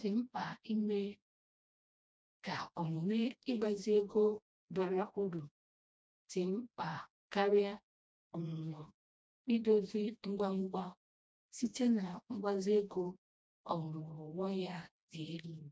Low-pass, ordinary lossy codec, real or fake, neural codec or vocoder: none; none; fake; codec, 16 kHz, 1 kbps, FreqCodec, smaller model